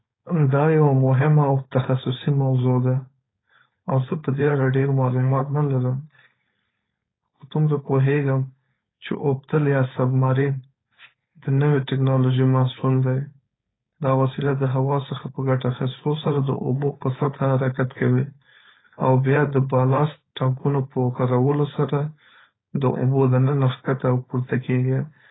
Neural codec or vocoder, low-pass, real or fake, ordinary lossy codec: codec, 16 kHz, 4.8 kbps, FACodec; 7.2 kHz; fake; AAC, 16 kbps